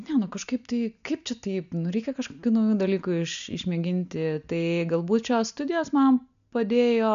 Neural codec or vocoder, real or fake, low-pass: none; real; 7.2 kHz